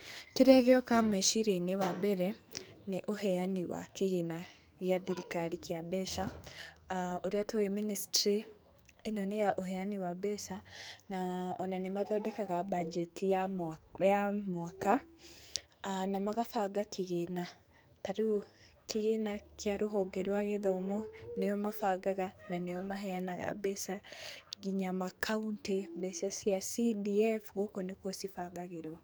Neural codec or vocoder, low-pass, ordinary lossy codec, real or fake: codec, 44.1 kHz, 2.6 kbps, SNAC; none; none; fake